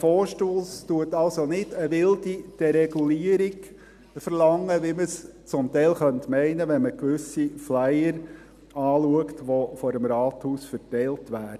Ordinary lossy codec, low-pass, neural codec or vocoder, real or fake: none; 14.4 kHz; none; real